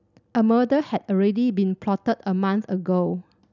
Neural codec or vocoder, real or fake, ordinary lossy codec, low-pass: none; real; none; 7.2 kHz